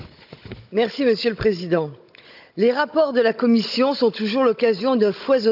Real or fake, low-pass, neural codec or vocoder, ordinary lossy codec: fake; 5.4 kHz; codec, 16 kHz, 16 kbps, FunCodec, trained on Chinese and English, 50 frames a second; AAC, 48 kbps